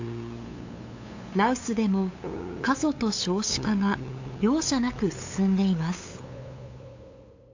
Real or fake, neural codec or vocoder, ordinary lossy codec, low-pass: fake; codec, 16 kHz, 8 kbps, FunCodec, trained on LibriTTS, 25 frames a second; AAC, 48 kbps; 7.2 kHz